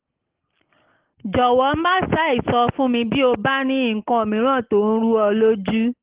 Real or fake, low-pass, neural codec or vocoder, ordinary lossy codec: real; 3.6 kHz; none; Opus, 16 kbps